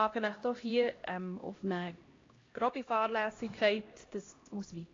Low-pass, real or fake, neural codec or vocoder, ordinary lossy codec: 7.2 kHz; fake; codec, 16 kHz, 1 kbps, X-Codec, HuBERT features, trained on LibriSpeech; AAC, 32 kbps